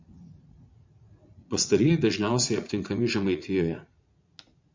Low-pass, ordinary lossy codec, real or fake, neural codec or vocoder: 7.2 kHz; MP3, 64 kbps; fake; vocoder, 44.1 kHz, 80 mel bands, Vocos